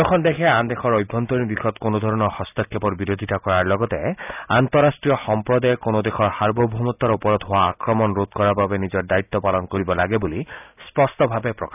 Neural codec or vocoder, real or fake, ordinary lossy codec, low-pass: none; real; none; 3.6 kHz